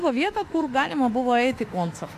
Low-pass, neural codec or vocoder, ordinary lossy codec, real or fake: 14.4 kHz; autoencoder, 48 kHz, 32 numbers a frame, DAC-VAE, trained on Japanese speech; AAC, 64 kbps; fake